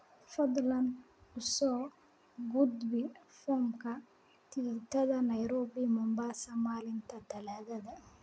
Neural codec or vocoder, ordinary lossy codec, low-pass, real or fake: none; none; none; real